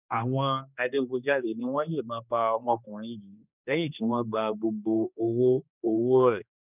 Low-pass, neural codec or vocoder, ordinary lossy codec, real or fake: 3.6 kHz; codec, 16 kHz, 2 kbps, X-Codec, HuBERT features, trained on general audio; none; fake